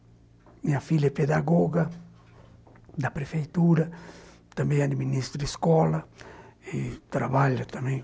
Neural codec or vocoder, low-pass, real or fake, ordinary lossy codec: none; none; real; none